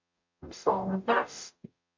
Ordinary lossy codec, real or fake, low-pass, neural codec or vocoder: MP3, 48 kbps; fake; 7.2 kHz; codec, 44.1 kHz, 0.9 kbps, DAC